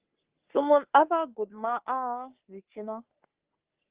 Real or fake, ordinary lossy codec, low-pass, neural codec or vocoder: fake; Opus, 16 kbps; 3.6 kHz; codec, 44.1 kHz, 3.4 kbps, Pupu-Codec